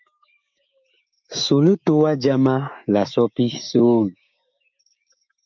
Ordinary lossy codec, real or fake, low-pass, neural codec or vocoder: MP3, 64 kbps; fake; 7.2 kHz; codec, 16 kHz, 6 kbps, DAC